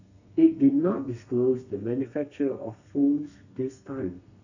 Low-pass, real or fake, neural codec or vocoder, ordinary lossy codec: 7.2 kHz; fake; codec, 32 kHz, 1.9 kbps, SNAC; none